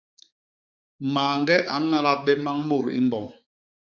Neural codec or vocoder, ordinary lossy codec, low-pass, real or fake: codec, 16 kHz, 4 kbps, X-Codec, HuBERT features, trained on balanced general audio; Opus, 64 kbps; 7.2 kHz; fake